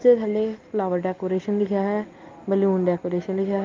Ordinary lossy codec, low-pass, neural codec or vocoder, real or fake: Opus, 24 kbps; 7.2 kHz; none; real